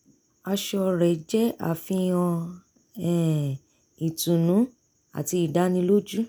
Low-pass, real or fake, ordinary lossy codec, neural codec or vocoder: none; real; none; none